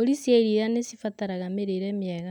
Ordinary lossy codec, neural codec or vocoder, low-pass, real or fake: none; none; 19.8 kHz; real